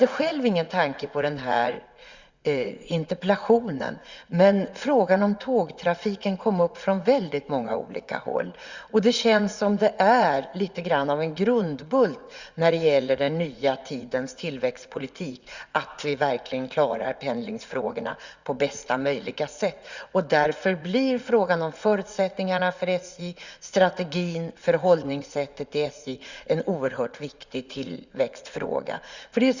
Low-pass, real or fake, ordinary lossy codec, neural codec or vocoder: 7.2 kHz; fake; Opus, 64 kbps; vocoder, 44.1 kHz, 80 mel bands, Vocos